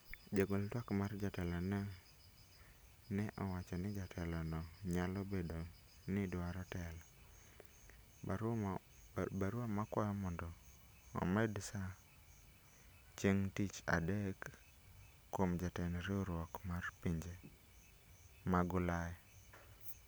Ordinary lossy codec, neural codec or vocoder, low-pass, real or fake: none; none; none; real